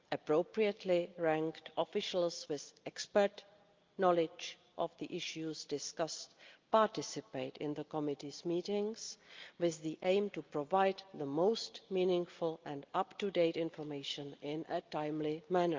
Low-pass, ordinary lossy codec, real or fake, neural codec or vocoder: 7.2 kHz; Opus, 24 kbps; real; none